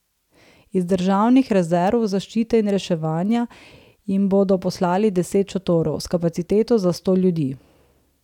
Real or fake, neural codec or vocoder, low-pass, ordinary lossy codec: real; none; 19.8 kHz; none